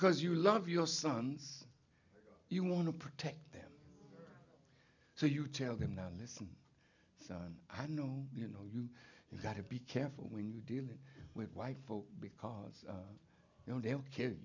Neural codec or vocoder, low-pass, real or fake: none; 7.2 kHz; real